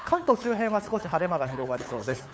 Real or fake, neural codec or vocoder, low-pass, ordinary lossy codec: fake; codec, 16 kHz, 8 kbps, FunCodec, trained on LibriTTS, 25 frames a second; none; none